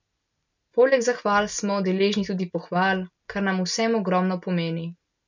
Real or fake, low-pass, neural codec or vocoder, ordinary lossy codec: real; 7.2 kHz; none; none